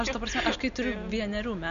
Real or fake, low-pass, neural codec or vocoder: real; 7.2 kHz; none